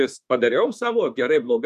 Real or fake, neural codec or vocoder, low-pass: fake; autoencoder, 48 kHz, 32 numbers a frame, DAC-VAE, trained on Japanese speech; 14.4 kHz